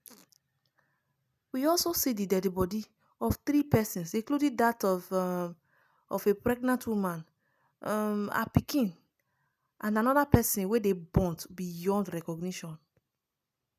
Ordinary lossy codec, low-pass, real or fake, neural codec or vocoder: none; 14.4 kHz; real; none